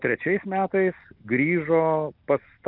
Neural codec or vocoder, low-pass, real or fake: none; 5.4 kHz; real